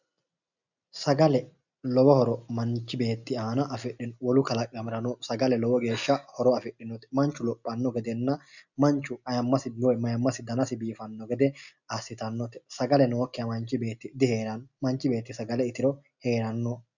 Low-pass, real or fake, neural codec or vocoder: 7.2 kHz; real; none